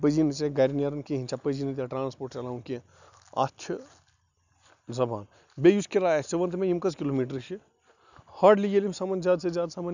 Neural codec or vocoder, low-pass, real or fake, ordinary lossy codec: none; 7.2 kHz; real; none